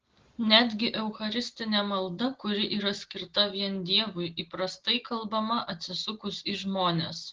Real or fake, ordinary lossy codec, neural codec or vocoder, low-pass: real; Opus, 16 kbps; none; 7.2 kHz